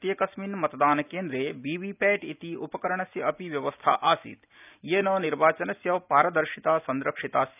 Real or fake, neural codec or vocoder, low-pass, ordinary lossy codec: real; none; 3.6 kHz; none